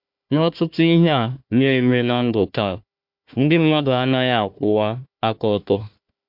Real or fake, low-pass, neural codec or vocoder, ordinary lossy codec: fake; 5.4 kHz; codec, 16 kHz, 1 kbps, FunCodec, trained on Chinese and English, 50 frames a second; MP3, 48 kbps